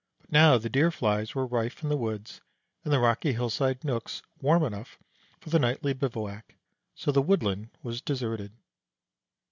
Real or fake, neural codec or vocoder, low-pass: real; none; 7.2 kHz